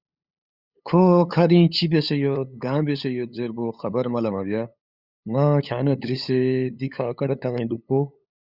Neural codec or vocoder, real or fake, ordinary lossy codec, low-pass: codec, 16 kHz, 8 kbps, FunCodec, trained on LibriTTS, 25 frames a second; fake; Opus, 64 kbps; 5.4 kHz